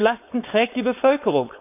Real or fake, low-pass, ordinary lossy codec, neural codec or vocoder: fake; 3.6 kHz; none; codec, 16 kHz, 4.8 kbps, FACodec